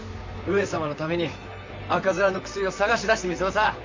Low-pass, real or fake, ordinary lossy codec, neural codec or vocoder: 7.2 kHz; fake; MP3, 64 kbps; vocoder, 44.1 kHz, 128 mel bands, Pupu-Vocoder